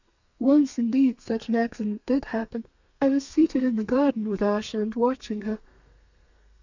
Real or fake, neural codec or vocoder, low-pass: fake; codec, 32 kHz, 1.9 kbps, SNAC; 7.2 kHz